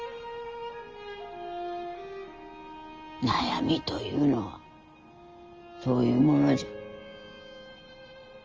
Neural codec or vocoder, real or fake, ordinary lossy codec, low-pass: none; real; Opus, 24 kbps; 7.2 kHz